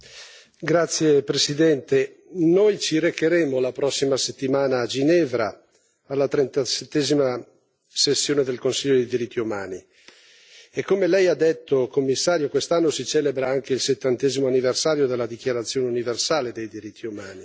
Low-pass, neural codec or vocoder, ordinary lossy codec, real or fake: none; none; none; real